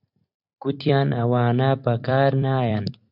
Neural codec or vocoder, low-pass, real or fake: vocoder, 44.1 kHz, 128 mel bands every 256 samples, BigVGAN v2; 5.4 kHz; fake